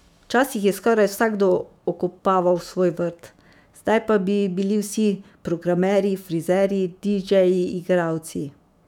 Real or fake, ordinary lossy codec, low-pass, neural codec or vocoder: fake; none; 19.8 kHz; autoencoder, 48 kHz, 128 numbers a frame, DAC-VAE, trained on Japanese speech